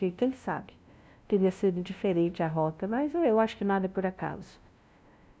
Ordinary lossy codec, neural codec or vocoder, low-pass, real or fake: none; codec, 16 kHz, 0.5 kbps, FunCodec, trained on LibriTTS, 25 frames a second; none; fake